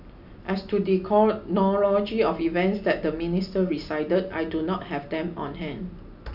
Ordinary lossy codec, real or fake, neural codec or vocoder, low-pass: AAC, 48 kbps; real; none; 5.4 kHz